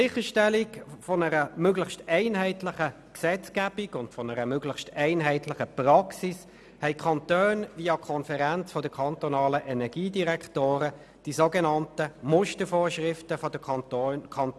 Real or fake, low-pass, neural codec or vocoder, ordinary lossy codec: real; none; none; none